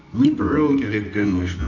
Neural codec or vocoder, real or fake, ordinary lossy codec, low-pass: codec, 24 kHz, 0.9 kbps, WavTokenizer, medium music audio release; fake; none; 7.2 kHz